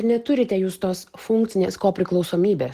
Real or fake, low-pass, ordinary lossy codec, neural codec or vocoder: real; 14.4 kHz; Opus, 16 kbps; none